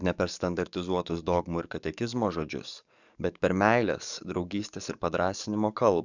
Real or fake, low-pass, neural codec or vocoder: fake; 7.2 kHz; codec, 44.1 kHz, 7.8 kbps, DAC